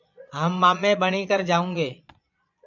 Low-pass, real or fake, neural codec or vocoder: 7.2 kHz; fake; vocoder, 22.05 kHz, 80 mel bands, Vocos